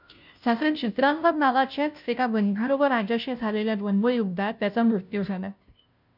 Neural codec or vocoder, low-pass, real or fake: codec, 16 kHz, 0.5 kbps, FunCodec, trained on Chinese and English, 25 frames a second; 5.4 kHz; fake